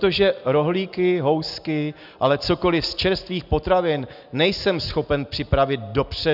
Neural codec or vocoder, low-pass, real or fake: none; 5.4 kHz; real